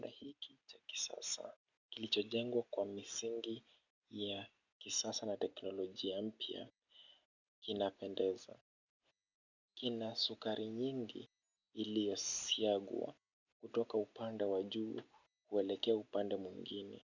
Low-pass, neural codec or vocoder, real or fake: 7.2 kHz; none; real